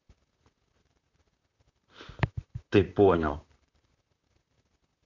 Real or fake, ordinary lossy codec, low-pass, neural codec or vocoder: fake; none; 7.2 kHz; vocoder, 44.1 kHz, 128 mel bands, Pupu-Vocoder